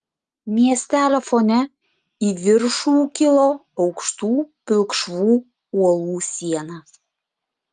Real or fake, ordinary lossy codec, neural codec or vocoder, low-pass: real; Opus, 32 kbps; none; 10.8 kHz